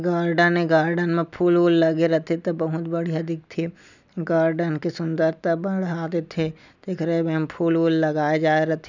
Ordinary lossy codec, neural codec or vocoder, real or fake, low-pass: none; none; real; 7.2 kHz